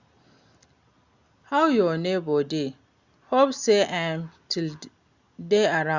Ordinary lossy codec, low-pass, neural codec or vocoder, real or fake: Opus, 64 kbps; 7.2 kHz; none; real